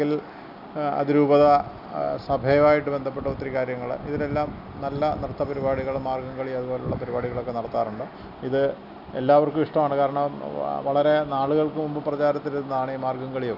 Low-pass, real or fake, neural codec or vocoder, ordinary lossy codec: 5.4 kHz; real; none; none